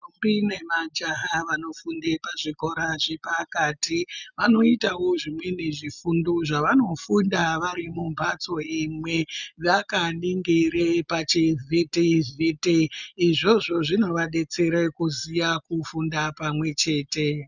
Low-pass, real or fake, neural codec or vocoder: 7.2 kHz; real; none